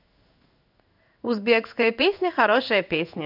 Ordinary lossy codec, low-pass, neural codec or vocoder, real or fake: none; 5.4 kHz; codec, 16 kHz in and 24 kHz out, 1 kbps, XY-Tokenizer; fake